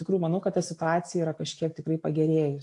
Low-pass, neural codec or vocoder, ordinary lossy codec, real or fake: 10.8 kHz; none; AAC, 48 kbps; real